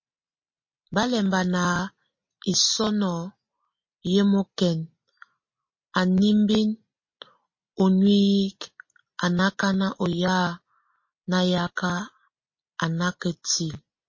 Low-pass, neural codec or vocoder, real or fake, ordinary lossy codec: 7.2 kHz; none; real; MP3, 32 kbps